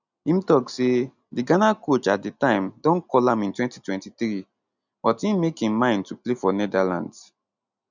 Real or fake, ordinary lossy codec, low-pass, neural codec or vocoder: real; none; 7.2 kHz; none